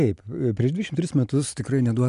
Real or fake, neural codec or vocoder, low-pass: real; none; 10.8 kHz